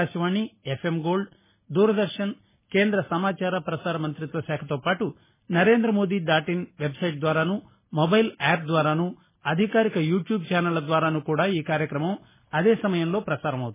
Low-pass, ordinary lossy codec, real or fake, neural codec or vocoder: 3.6 kHz; MP3, 16 kbps; real; none